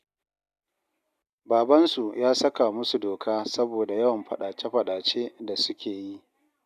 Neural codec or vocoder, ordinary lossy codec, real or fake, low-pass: none; none; real; 14.4 kHz